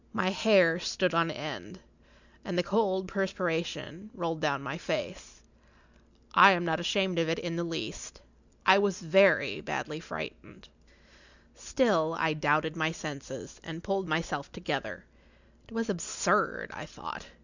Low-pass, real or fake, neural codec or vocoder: 7.2 kHz; real; none